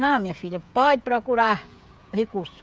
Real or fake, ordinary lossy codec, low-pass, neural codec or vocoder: fake; none; none; codec, 16 kHz, 8 kbps, FreqCodec, smaller model